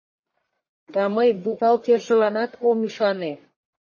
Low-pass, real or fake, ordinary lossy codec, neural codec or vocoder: 7.2 kHz; fake; MP3, 32 kbps; codec, 44.1 kHz, 1.7 kbps, Pupu-Codec